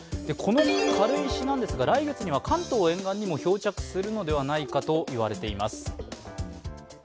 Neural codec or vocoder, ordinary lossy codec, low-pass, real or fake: none; none; none; real